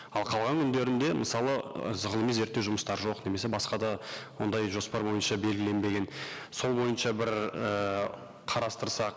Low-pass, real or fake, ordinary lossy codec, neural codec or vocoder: none; real; none; none